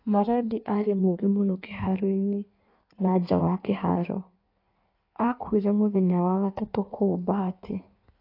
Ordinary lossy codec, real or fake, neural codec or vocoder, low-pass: AAC, 32 kbps; fake; codec, 16 kHz in and 24 kHz out, 1.1 kbps, FireRedTTS-2 codec; 5.4 kHz